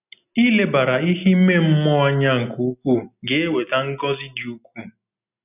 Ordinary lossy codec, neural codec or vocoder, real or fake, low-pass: none; none; real; 3.6 kHz